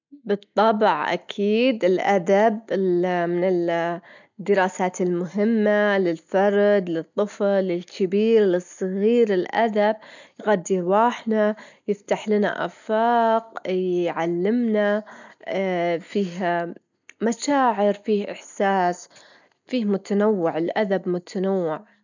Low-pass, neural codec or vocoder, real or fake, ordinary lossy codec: 7.2 kHz; none; real; none